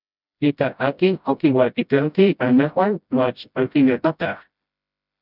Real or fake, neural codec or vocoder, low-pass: fake; codec, 16 kHz, 0.5 kbps, FreqCodec, smaller model; 5.4 kHz